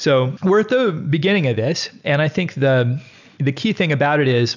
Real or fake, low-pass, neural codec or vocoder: real; 7.2 kHz; none